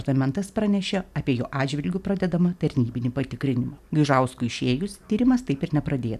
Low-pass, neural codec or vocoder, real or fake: 14.4 kHz; none; real